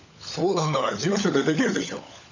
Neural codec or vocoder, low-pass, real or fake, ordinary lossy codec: codec, 16 kHz, 16 kbps, FunCodec, trained on LibriTTS, 50 frames a second; 7.2 kHz; fake; none